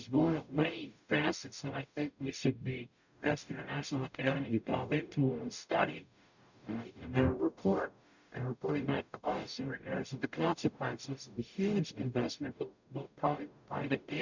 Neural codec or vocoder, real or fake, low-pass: codec, 44.1 kHz, 0.9 kbps, DAC; fake; 7.2 kHz